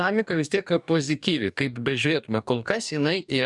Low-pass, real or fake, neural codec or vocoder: 10.8 kHz; fake; codec, 44.1 kHz, 2.6 kbps, DAC